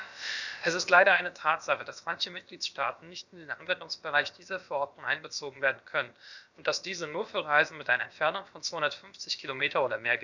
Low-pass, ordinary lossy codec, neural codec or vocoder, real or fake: 7.2 kHz; none; codec, 16 kHz, about 1 kbps, DyCAST, with the encoder's durations; fake